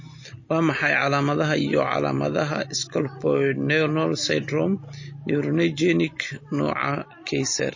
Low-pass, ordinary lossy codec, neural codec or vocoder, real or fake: 7.2 kHz; MP3, 32 kbps; none; real